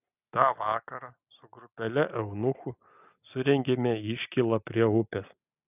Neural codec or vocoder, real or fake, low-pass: none; real; 3.6 kHz